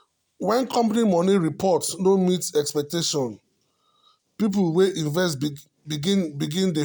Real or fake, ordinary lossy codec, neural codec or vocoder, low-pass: real; none; none; none